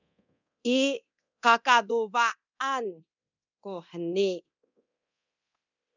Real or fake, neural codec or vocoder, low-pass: fake; codec, 24 kHz, 0.9 kbps, DualCodec; 7.2 kHz